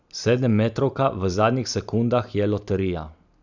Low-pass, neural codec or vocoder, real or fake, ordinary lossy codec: 7.2 kHz; none; real; none